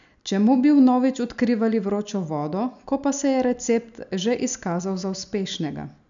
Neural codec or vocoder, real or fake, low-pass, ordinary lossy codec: none; real; 7.2 kHz; none